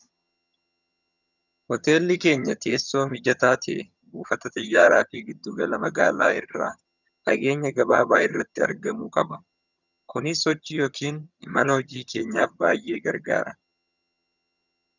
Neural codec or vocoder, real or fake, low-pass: vocoder, 22.05 kHz, 80 mel bands, HiFi-GAN; fake; 7.2 kHz